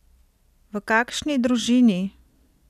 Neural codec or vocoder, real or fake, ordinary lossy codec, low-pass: none; real; none; 14.4 kHz